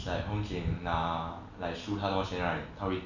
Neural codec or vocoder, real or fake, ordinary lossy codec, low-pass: none; real; none; 7.2 kHz